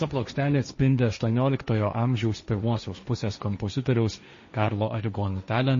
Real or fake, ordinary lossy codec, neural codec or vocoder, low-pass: fake; MP3, 32 kbps; codec, 16 kHz, 1.1 kbps, Voila-Tokenizer; 7.2 kHz